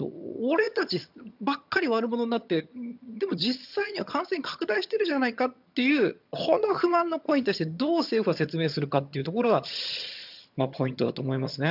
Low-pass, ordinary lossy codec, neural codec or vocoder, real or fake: 5.4 kHz; none; vocoder, 22.05 kHz, 80 mel bands, HiFi-GAN; fake